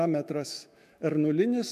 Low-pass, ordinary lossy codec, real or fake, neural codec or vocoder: 14.4 kHz; MP3, 96 kbps; fake; autoencoder, 48 kHz, 128 numbers a frame, DAC-VAE, trained on Japanese speech